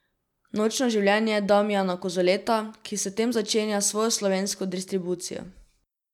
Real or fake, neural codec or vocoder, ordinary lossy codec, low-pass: real; none; none; 19.8 kHz